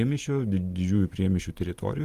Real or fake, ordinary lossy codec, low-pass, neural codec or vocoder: fake; Opus, 32 kbps; 14.4 kHz; vocoder, 44.1 kHz, 128 mel bands every 512 samples, BigVGAN v2